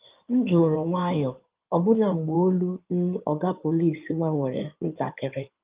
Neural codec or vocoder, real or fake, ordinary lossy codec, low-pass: codec, 16 kHz in and 24 kHz out, 2.2 kbps, FireRedTTS-2 codec; fake; Opus, 32 kbps; 3.6 kHz